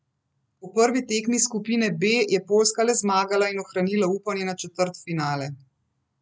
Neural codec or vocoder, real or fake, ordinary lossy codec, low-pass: none; real; none; none